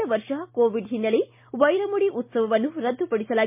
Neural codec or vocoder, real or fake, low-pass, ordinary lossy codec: none; real; 3.6 kHz; MP3, 32 kbps